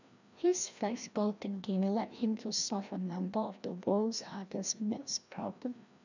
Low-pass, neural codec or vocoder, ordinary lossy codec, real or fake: 7.2 kHz; codec, 16 kHz, 1 kbps, FreqCodec, larger model; none; fake